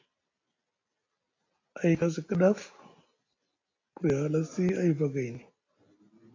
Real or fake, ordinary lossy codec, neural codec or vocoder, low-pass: real; AAC, 32 kbps; none; 7.2 kHz